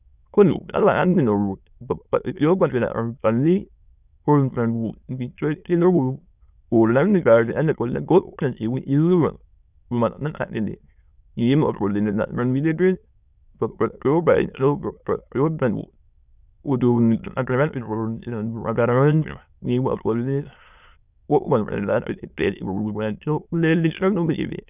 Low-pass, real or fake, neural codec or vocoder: 3.6 kHz; fake; autoencoder, 22.05 kHz, a latent of 192 numbers a frame, VITS, trained on many speakers